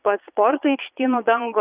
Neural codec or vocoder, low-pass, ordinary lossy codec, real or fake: vocoder, 22.05 kHz, 80 mel bands, Vocos; 3.6 kHz; Opus, 64 kbps; fake